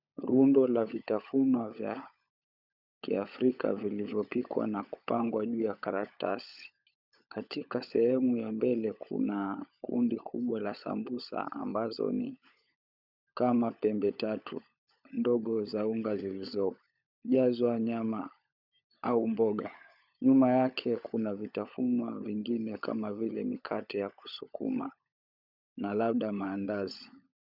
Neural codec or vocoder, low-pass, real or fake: codec, 16 kHz, 16 kbps, FunCodec, trained on LibriTTS, 50 frames a second; 5.4 kHz; fake